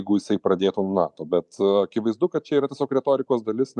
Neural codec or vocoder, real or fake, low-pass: vocoder, 44.1 kHz, 128 mel bands every 512 samples, BigVGAN v2; fake; 10.8 kHz